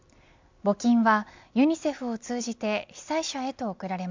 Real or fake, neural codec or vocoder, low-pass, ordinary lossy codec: real; none; 7.2 kHz; MP3, 64 kbps